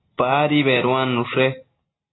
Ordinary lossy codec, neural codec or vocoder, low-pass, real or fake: AAC, 16 kbps; none; 7.2 kHz; real